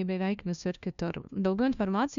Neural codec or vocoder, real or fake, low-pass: codec, 16 kHz, 1 kbps, FunCodec, trained on LibriTTS, 50 frames a second; fake; 7.2 kHz